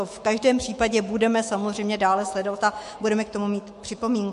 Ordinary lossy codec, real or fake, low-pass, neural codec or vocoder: MP3, 48 kbps; fake; 14.4 kHz; autoencoder, 48 kHz, 128 numbers a frame, DAC-VAE, trained on Japanese speech